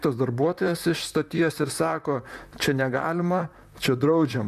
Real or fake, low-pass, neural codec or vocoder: fake; 14.4 kHz; vocoder, 44.1 kHz, 128 mel bands, Pupu-Vocoder